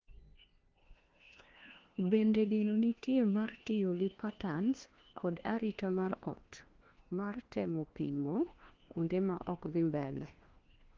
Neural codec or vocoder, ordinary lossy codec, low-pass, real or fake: codec, 16 kHz, 1 kbps, FunCodec, trained on LibriTTS, 50 frames a second; Opus, 16 kbps; 7.2 kHz; fake